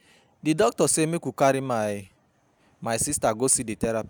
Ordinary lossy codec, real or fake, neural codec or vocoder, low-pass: none; real; none; none